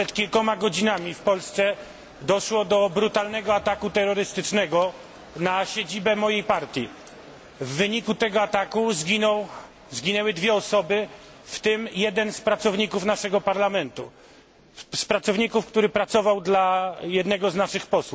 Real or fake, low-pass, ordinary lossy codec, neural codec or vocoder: real; none; none; none